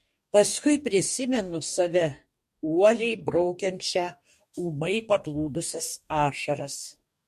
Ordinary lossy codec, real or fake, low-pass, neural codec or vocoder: MP3, 64 kbps; fake; 14.4 kHz; codec, 44.1 kHz, 2.6 kbps, DAC